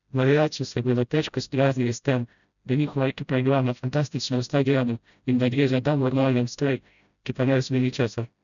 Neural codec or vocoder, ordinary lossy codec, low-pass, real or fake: codec, 16 kHz, 0.5 kbps, FreqCodec, smaller model; MP3, 96 kbps; 7.2 kHz; fake